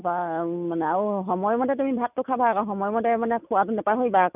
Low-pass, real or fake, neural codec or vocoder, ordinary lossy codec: 3.6 kHz; real; none; none